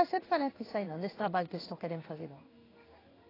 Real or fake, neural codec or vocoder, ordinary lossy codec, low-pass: fake; codec, 16 kHz in and 24 kHz out, 1 kbps, XY-Tokenizer; AAC, 24 kbps; 5.4 kHz